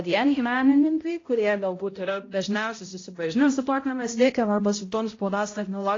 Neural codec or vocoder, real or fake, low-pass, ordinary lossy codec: codec, 16 kHz, 0.5 kbps, X-Codec, HuBERT features, trained on balanced general audio; fake; 7.2 kHz; AAC, 32 kbps